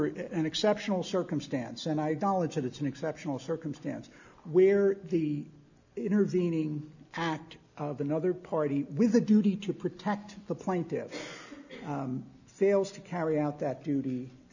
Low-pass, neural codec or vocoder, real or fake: 7.2 kHz; none; real